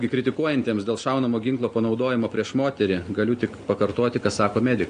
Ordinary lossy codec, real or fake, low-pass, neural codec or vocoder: AAC, 96 kbps; real; 10.8 kHz; none